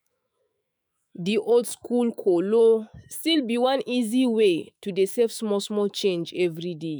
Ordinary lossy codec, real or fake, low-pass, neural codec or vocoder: none; fake; none; autoencoder, 48 kHz, 128 numbers a frame, DAC-VAE, trained on Japanese speech